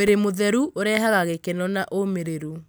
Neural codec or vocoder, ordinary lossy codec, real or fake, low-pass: none; none; real; none